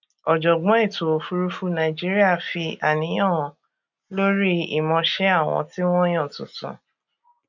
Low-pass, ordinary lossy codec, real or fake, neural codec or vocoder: 7.2 kHz; none; real; none